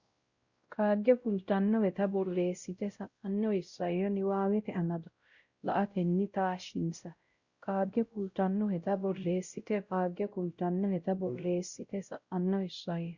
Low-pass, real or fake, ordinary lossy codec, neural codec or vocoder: 7.2 kHz; fake; Opus, 64 kbps; codec, 16 kHz, 0.5 kbps, X-Codec, WavLM features, trained on Multilingual LibriSpeech